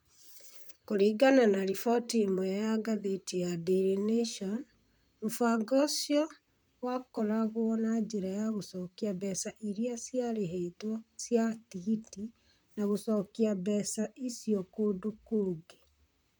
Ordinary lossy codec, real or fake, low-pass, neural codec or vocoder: none; fake; none; vocoder, 44.1 kHz, 128 mel bands, Pupu-Vocoder